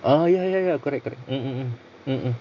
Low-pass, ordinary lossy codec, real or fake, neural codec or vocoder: 7.2 kHz; none; real; none